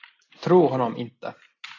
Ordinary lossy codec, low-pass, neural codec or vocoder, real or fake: AAC, 32 kbps; 7.2 kHz; none; real